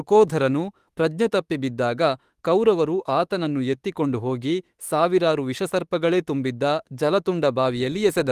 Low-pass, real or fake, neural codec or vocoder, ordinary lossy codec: 14.4 kHz; fake; autoencoder, 48 kHz, 32 numbers a frame, DAC-VAE, trained on Japanese speech; Opus, 24 kbps